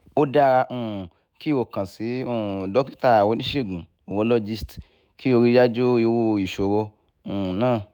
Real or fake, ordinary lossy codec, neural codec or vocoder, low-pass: fake; none; autoencoder, 48 kHz, 128 numbers a frame, DAC-VAE, trained on Japanese speech; none